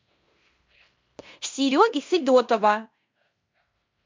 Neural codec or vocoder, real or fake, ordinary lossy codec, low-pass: codec, 16 kHz in and 24 kHz out, 0.9 kbps, LongCat-Audio-Codec, fine tuned four codebook decoder; fake; MP3, 64 kbps; 7.2 kHz